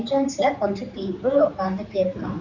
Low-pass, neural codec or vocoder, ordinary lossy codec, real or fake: 7.2 kHz; codec, 16 kHz, 4 kbps, X-Codec, HuBERT features, trained on general audio; none; fake